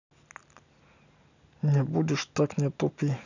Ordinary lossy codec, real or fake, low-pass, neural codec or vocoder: none; real; 7.2 kHz; none